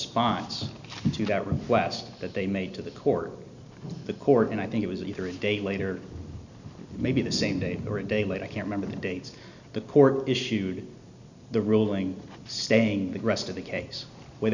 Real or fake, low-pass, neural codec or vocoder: real; 7.2 kHz; none